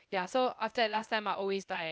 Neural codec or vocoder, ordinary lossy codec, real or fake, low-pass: codec, 16 kHz, 0.8 kbps, ZipCodec; none; fake; none